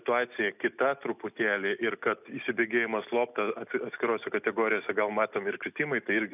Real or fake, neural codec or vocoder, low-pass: real; none; 3.6 kHz